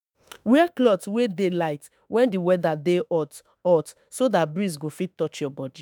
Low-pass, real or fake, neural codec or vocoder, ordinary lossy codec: none; fake; autoencoder, 48 kHz, 32 numbers a frame, DAC-VAE, trained on Japanese speech; none